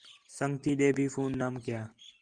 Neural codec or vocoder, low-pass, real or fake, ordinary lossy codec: none; 9.9 kHz; real; Opus, 24 kbps